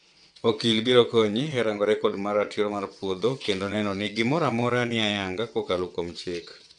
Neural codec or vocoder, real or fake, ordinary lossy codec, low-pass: vocoder, 22.05 kHz, 80 mel bands, WaveNeXt; fake; none; 9.9 kHz